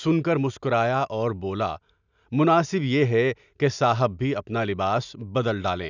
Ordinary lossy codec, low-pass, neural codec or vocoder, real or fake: none; 7.2 kHz; none; real